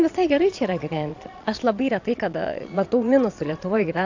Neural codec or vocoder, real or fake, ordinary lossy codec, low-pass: vocoder, 22.05 kHz, 80 mel bands, Vocos; fake; AAC, 48 kbps; 7.2 kHz